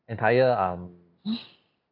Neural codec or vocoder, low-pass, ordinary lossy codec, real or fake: none; 5.4 kHz; AAC, 32 kbps; real